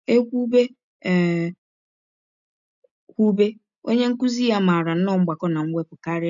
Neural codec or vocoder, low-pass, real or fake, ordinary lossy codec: none; 7.2 kHz; real; none